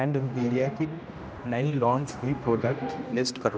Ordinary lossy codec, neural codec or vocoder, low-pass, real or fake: none; codec, 16 kHz, 1 kbps, X-Codec, HuBERT features, trained on general audio; none; fake